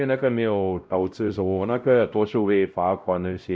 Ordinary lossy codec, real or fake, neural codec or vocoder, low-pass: none; fake; codec, 16 kHz, 0.5 kbps, X-Codec, WavLM features, trained on Multilingual LibriSpeech; none